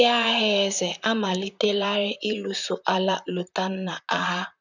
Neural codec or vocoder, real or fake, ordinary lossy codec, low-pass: vocoder, 44.1 kHz, 128 mel bands, Pupu-Vocoder; fake; none; 7.2 kHz